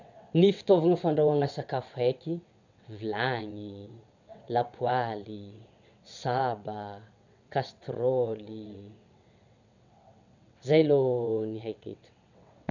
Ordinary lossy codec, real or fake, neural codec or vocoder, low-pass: none; fake; vocoder, 22.05 kHz, 80 mel bands, WaveNeXt; 7.2 kHz